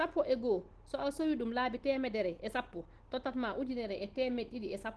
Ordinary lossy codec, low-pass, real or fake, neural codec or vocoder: Opus, 16 kbps; 10.8 kHz; real; none